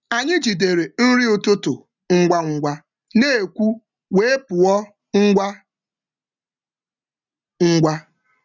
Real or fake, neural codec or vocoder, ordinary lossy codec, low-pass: real; none; none; 7.2 kHz